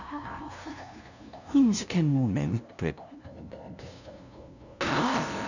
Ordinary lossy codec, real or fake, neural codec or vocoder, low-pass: none; fake; codec, 16 kHz, 0.5 kbps, FunCodec, trained on LibriTTS, 25 frames a second; 7.2 kHz